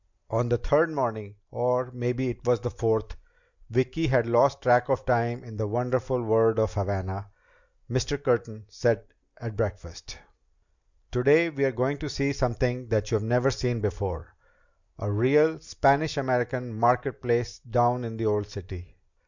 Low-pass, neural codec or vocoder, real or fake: 7.2 kHz; none; real